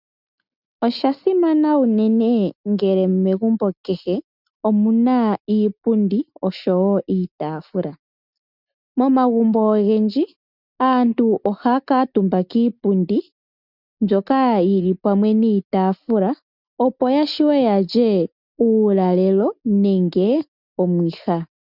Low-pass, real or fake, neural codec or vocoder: 5.4 kHz; real; none